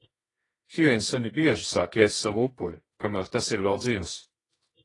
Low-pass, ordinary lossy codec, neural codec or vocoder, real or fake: 10.8 kHz; AAC, 32 kbps; codec, 24 kHz, 0.9 kbps, WavTokenizer, medium music audio release; fake